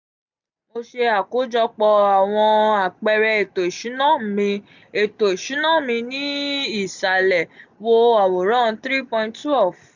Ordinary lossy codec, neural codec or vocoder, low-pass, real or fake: none; none; 7.2 kHz; real